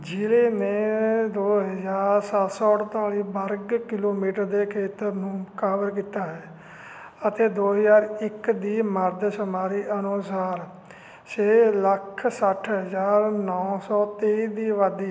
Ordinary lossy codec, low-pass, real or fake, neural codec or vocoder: none; none; real; none